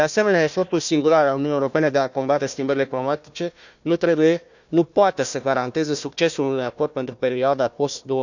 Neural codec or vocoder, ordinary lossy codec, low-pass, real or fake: codec, 16 kHz, 1 kbps, FunCodec, trained on Chinese and English, 50 frames a second; none; 7.2 kHz; fake